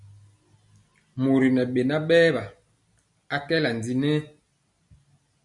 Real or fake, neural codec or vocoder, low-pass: real; none; 10.8 kHz